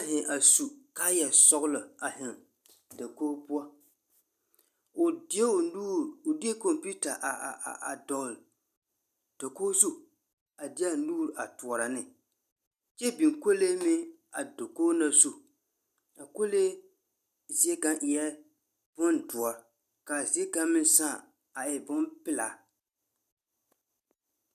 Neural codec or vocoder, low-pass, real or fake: none; 14.4 kHz; real